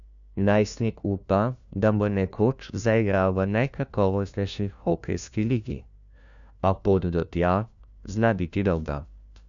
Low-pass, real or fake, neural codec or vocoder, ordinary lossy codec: 7.2 kHz; fake; codec, 16 kHz, 1 kbps, FunCodec, trained on LibriTTS, 50 frames a second; MP3, 96 kbps